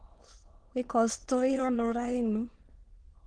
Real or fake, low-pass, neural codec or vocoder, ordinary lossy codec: fake; 9.9 kHz; autoencoder, 22.05 kHz, a latent of 192 numbers a frame, VITS, trained on many speakers; Opus, 16 kbps